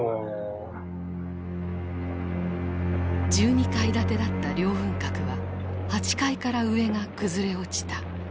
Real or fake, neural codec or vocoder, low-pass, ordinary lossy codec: real; none; none; none